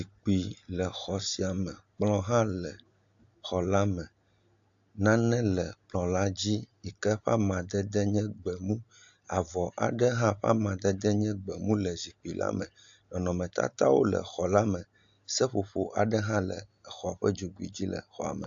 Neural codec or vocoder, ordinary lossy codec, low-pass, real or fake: none; AAC, 64 kbps; 7.2 kHz; real